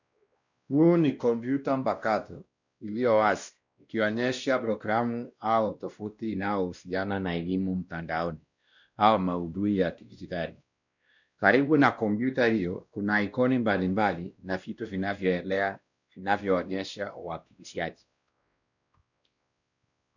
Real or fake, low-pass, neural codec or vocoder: fake; 7.2 kHz; codec, 16 kHz, 1 kbps, X-Codec, WavLM features, trained on Multilingual LibriSpeech